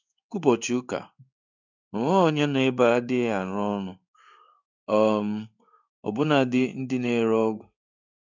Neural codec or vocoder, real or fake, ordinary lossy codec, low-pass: codec, 16 kHz in and 24 kHz out, 1 kbps, XY-Tokenizer; fake; none; 7.2 kHz